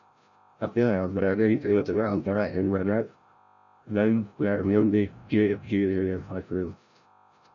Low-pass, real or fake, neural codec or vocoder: 7.2 kHz; fake; codec, 16 kHz, 0.5 kbps, FreqCodec, larger model